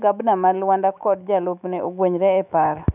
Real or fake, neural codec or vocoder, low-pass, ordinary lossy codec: fake; autoencoder, 48 kHz, 128 numbers a frame, DAC-VAE, trained on Japanese speech; 3.6 kHz; none